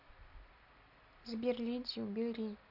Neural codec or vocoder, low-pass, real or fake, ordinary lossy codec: none; 5.4 kHz; real; none